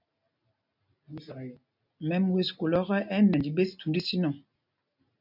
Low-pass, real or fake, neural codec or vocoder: 5.4 kHz; real; none